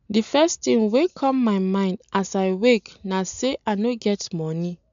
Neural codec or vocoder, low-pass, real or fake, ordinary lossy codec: none; 7.2 kHz; real; MP3, 96 kbps